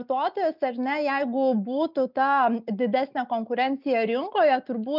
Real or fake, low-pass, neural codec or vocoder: real; 5.4 kHz; none